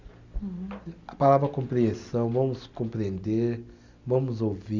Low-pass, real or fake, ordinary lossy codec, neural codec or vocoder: 7.2 kHz; real; Opus, 64 kbps; none